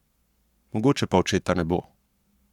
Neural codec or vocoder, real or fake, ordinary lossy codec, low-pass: codec, 44.1 kHz, 7.8 kbps, Pupu-Codec; fake; none; 19.8 kHz